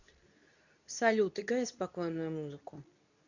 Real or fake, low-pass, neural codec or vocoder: fake; 7.2 kHz; codec, 24 kHz, 0.9 kbps, WavTokenizer, medium speech release version 2